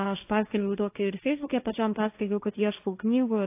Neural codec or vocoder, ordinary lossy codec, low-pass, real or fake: codec, 16 kHz, 1.1 kbps, Voila-Tokenizer; AAC, 24 kbps; 3.6 kHz; fake